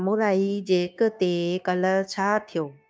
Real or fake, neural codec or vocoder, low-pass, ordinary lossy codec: fake; codec, 16 kHz, 0.9 kbps, LongCat-Audio-Codec; none; none